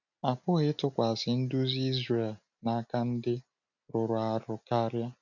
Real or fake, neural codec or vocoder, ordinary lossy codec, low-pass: real; none; none; 7.2 kHz